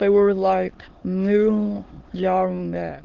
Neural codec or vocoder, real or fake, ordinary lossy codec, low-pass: autoencoder, 22.05 kHz, a latent of 192 numbers a frame, VITS, trained on many speakers; fake; Opus, 16 kbps; 7.2 kHz